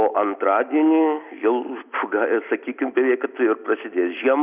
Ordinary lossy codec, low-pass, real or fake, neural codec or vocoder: Opus, 64 kbps; 3.6 kHz; real; none